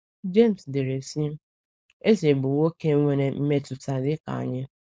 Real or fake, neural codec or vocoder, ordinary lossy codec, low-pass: fake; codec, 16 kHz, 4.8 kbps, FACodec; none; none